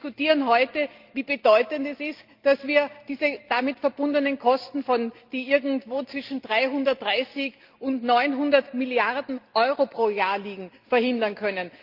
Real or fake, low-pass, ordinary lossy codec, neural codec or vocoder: real; 5.4 kHz; Opus, 24 kbps; none